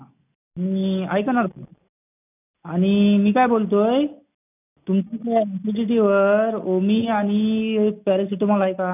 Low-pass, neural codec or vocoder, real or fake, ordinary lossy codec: 3.6 kHz; none; real; none